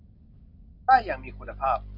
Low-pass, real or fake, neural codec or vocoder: 5.4 kHz; real; none